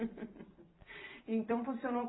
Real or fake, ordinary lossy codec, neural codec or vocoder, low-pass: fake; AAC, 16 kbps; vocoder, 44.1 kHz, 128 mel bands every 256 samples, BigVGAN v2; 7.2 kHz